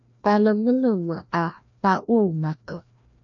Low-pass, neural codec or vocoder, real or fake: 7.2 kHz; codec, 16 kHz, 1 kbps, FreqCodec, larger model; fake